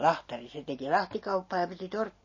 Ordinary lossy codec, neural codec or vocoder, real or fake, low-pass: MP3, 32 kbps; none; real; 7.2 kHz